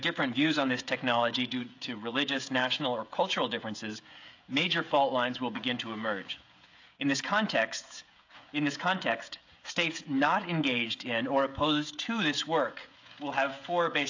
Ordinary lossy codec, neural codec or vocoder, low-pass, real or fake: AAC, 48 kbps; codec, 16 kHz, 16 kbps, FreqCodec, smaller model; 7.2 kHz; fake